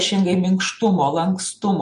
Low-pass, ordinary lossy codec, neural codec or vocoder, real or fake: 10.8 kHz; MP3, 48 kbps; none; real